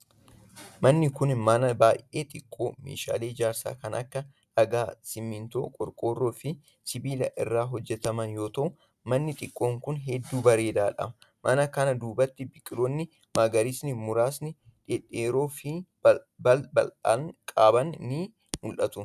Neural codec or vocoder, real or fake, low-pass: none; real; 14.4 kHz